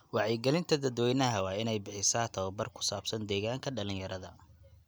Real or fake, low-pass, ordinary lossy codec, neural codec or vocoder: real; none; none; none